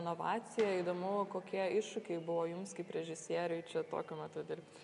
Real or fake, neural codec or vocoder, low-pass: real; none; 10.8 kHz